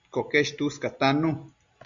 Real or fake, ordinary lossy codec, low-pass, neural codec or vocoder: real; Opus, 64 kbps; 7.2 kHz; none